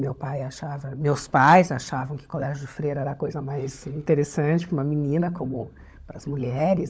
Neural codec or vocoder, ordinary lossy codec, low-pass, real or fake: codec, 16 kHz, 16 kbps, FunCodec, trained on LibriTTS, 50 frames a second; none; none; fake